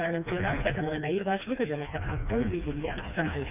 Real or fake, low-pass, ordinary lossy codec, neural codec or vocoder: fake; 3.6 kHz; none; codec, 16 kHz, 2 kbps, FreqCodec, smaller model